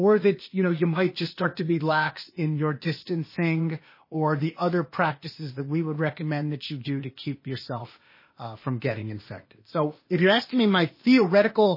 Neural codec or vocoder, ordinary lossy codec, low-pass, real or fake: codec, 16 kHz, 0.8 kbps, ZipCodec; MP3, 24 kbps; 5.4 kHz; fake